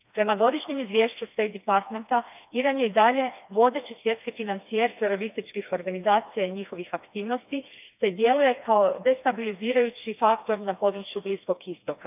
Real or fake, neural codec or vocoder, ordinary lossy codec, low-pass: fake; codec, 16 kHz, 2 kbps, FreqCodec, smaller model; none; 3.6 kHz